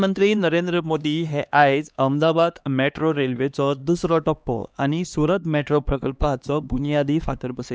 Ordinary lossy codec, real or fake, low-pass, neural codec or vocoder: none; fake; none; codec, 16 kHz, 2 kbps, X-Codec, HuBERT features, trained on LibriSpeech